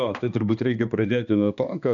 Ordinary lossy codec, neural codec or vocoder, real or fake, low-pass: MP3, 96 kbps; codec, 16 kHz, 2 kbps, X-Codec, HuBERT features, trained on balanced general audio; fake; 7.2 kHz